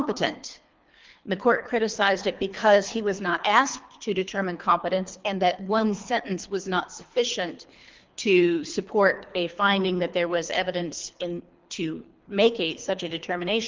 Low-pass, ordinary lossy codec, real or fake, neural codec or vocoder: 7.2 kHz; Opus, 24 kbps; fake; codec, 24 kHz, 3 kbps, HILCodec